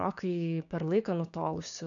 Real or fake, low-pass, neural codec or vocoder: fake; 7.2 kHz; codec, 16 kHz, 6 kbps, DAC